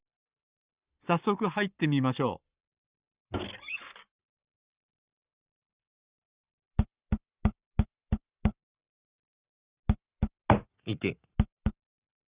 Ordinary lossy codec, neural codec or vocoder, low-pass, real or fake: Opus, 64 kbps; codec, 44.1 kHz, 7.8 kbps, Pupu-Codec; 3.6 kHz; fake